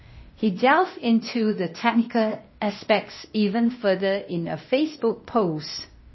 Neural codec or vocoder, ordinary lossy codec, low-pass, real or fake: codec, 16 kHz, 0.8 kbps, ZipCodec; MP3, 24 kbps; 7.2 kHz; fake